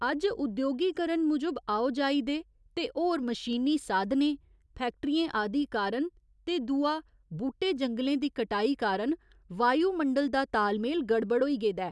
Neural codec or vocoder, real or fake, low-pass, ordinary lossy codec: none; real; none; none